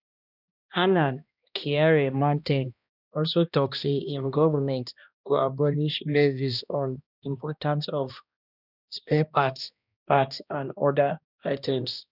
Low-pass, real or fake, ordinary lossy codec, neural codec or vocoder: 5.4 kHz; fake; none; codec, 16 kHz, 1 kbps, X-Codec, HuBERT features, trained on balanced general audio